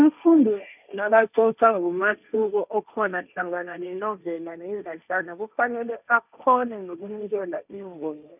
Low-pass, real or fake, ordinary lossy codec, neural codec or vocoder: 3.6 kHz; fake; none; codec, 16 kHz, 1.1 kbps, Voila-Tokenizer